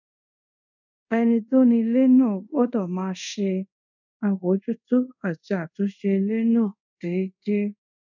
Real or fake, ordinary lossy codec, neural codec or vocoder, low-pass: fake; none; codec, 24 kHz, 0.5 kbps, DualCodec; 7.2 kHz